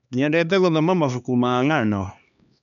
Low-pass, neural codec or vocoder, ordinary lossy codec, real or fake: 7.2 kHz; codec, 16 kHz, 2 kbps, X-Codec, HuBERT features, trained on LibriSpeech; none; fake